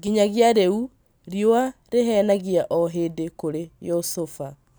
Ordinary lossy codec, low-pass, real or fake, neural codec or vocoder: none; none; real; none